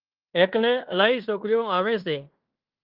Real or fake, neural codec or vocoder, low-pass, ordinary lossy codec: fake; codec, 16 kHz in and 24 kHz out, 0.9 kbps, LongCat-Audio-Codec, fine tuned four codebook decoder; 5.4 kHz; Opus, 32 kbps